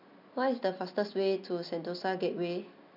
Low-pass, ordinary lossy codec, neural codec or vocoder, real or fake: 5.4 kHz; none; none; real